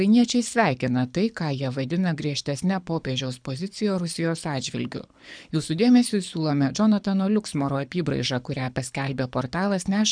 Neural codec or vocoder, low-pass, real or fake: codec, 24 kHz, 6 kbps, HILCodec; 9.9 kHz; fake